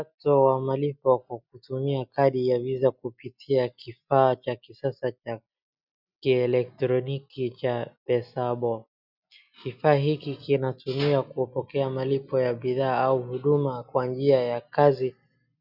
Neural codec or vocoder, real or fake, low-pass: none; real; 5.4 kHz